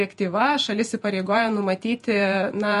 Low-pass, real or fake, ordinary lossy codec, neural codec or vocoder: 14.4 kHz; fake; MP3, 48 kbps; vocoder, 48 kHz, 128 mel bands, Vocos